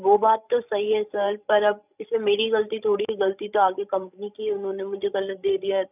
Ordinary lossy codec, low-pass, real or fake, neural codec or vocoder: none; 3.6 kHz; fake; codec, 16 kHz, 16 kbps, FreqCodec, larger model